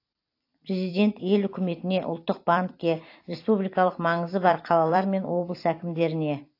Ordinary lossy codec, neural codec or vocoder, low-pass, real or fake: AAC, 32 kbps; vocoder, 44.1 kHz, 128 mel bands every 256 samples, BigVGAN v2; 5.4 kHz; fake